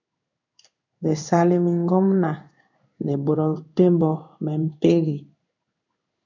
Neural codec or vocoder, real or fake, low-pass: codec, 16 kHz in and 24 kHz out, 1 kbps, XY-Tokenizer; fake; 7.2 kHz